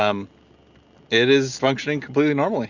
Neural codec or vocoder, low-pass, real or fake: none; 7.2 kHz; real